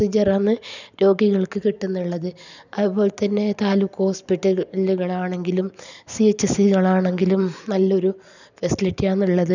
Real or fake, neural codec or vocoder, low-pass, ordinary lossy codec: real; none; 7.2 kHz; none